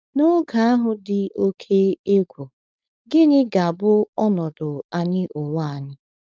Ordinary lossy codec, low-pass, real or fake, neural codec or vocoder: none; none; fake; codec, 16 kHz, 4.8 kbps, FACodec